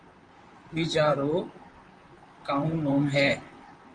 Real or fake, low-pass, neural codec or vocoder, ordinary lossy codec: fake; 9.9 kHz; vocoder, 44.1 kHz, 128 mel bands, Pupu-Vocoder; Opus, 32 kbps